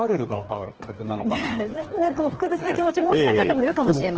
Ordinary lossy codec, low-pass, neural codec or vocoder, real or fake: Opus, 16 kbps; 7.2 kHz; codec, 16 kHz, 2 kbps, FreqCodec, larger model; fake